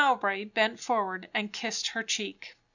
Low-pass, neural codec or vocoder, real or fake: 7.2 kHz; none; real